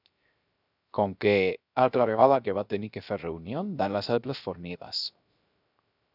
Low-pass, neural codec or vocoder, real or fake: 5.4 kHz; codec, 16 kHz, 0.3 kbps, FocalCodec; fake